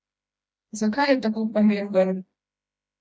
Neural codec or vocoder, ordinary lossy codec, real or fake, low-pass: codec, 16 kHz, 1 kbps, FreqCodec, smaller model; none; fake; none